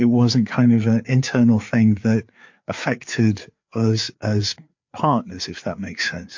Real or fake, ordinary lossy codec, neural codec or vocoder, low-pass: fake; MP3, 48 kbps; codec, 16 kHz, 4 kbps, FreqCodec, larger model; 7.2 kHz